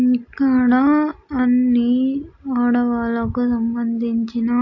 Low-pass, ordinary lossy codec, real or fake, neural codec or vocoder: 7.2 kHz; AAC, 48 kbps; real; none